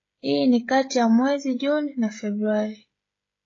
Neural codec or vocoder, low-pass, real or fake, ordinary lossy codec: codec, 16 kHz, 16 kbps, FreqCodec, smaller model; 7.2 kHz; fake; AAC, 32 kbps